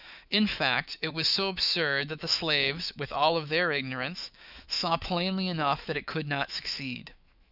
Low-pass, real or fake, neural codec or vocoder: 5.4 kHz; fake; autoencoder, 48 kHz, 128 numbers a frame, DAC-VAE, trained on Japanese speech